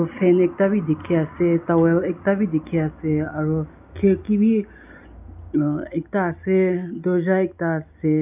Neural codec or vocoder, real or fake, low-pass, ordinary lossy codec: none; real; 3.6 kHz; none